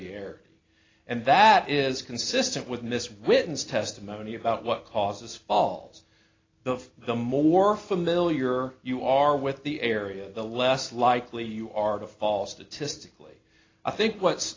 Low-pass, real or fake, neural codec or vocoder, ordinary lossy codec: 7.2 kHz; real; none; AAC, 32 kbps